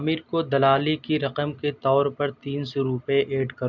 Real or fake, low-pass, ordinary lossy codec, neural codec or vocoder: real; 7.2 kHz; none; none